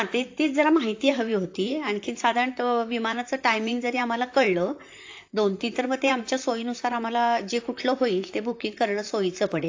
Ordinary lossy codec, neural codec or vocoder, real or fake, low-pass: AAC, 48 kbps; vocoder, 44.1 kHz, 128 mel bands, Pupu-Vocoder; fake; 7.2 kHz